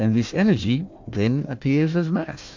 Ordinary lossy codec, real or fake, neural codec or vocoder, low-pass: MP3, 48 kbps; fake; codec, 16 kHz, 1 kbps, FunCodec, trained on Chinese and English, 50 frames a second; 7.2 kHz